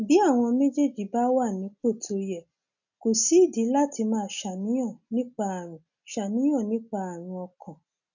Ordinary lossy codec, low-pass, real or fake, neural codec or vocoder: none; 7.2 kHz; real; none